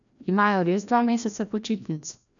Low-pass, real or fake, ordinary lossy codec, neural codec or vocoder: 7.2 kHz; fake; none; codec, 16 kHz, 1 kbps, FreqCodec, larger model